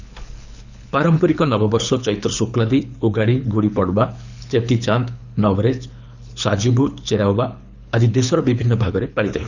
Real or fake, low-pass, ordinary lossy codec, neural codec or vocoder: fake; 7.2 kHz; none; codec, 24 kHz, 6 kbps, HILCodec